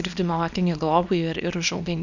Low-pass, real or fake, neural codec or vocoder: 7.2 kHz; fake; codec, 24 kHz, 0.9 kbps, WavTokenizer, small release